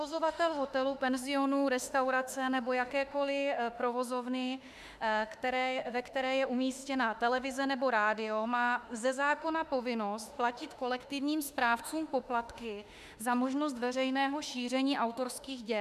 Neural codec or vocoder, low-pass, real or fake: autoencoder, 48 kHz, 32 numbers a frame, DAC-VAE, trained on Japanese speech; 14.4 kHz; fake